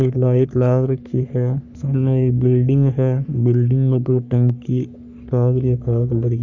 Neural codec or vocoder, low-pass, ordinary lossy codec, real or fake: codec, 44.1 kHz, 3.4 kbps, Pupu-Codec; 7.2 kHz; none; fake